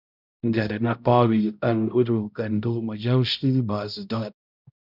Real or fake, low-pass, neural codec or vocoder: fake; 5.4 kHz; codec, 16 kHz, 0.5 kbps, X-Codec, HuBERT features, trained on balanced general audio